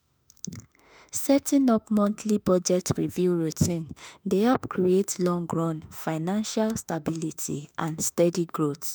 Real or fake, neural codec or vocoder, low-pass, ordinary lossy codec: fake; autoencoder, 48 kHz, 32 numbers a frame, DAC-VAE, trained on Japanese speech; none; none